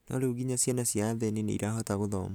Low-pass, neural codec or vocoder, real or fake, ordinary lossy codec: none; none; real; none